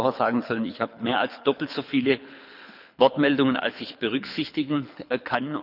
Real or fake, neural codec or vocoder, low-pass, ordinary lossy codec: fake; codec, 24 kHz, 6 kbps, HILCodec; 5.4 kHz; none